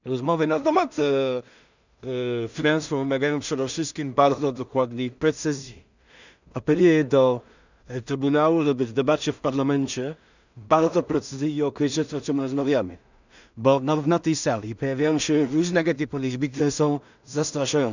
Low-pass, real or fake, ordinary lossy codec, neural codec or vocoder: 7.2 kHz; fake; none; codec, 16 kHz in and 24 kHz out, 0.4 kbps, LongCat-Audio-Codec, two codebook decoder